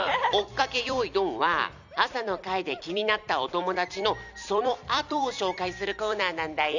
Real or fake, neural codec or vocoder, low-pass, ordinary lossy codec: fake; vocoder, 44.1 kHz, 128 mel bands every 256 samples, BigVGAN v2; 7.2 kHz; none